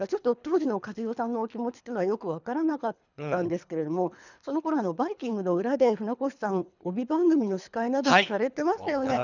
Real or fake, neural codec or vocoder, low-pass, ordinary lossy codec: fake; codec, 24 kHz, 3 kbps, HILCodec; 7.2 kHz; none